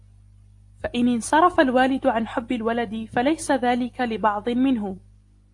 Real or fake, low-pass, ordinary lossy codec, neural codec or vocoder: real; 10.8 kHz; AAC, 48 kbps; none